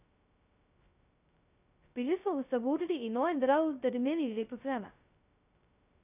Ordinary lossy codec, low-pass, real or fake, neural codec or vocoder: none; 3.6 kHz; fake; codec, 16 kHz, 0.2 kbps, FocalCodec